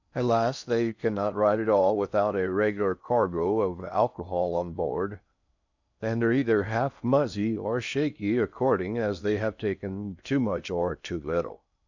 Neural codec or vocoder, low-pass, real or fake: codec, 16 kHz in and 24 kHz out, 0.6 kbps, FocalCodec, streaming, 4096 codes; 7.2 kHz; fake